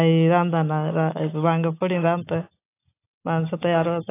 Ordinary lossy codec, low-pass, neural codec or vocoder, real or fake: AAC, 24 kbps; 3.6 kHz; none; real